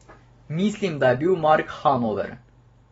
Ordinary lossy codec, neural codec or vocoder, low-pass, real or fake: AAC, 24 kbps; none; 19.8 kHz; real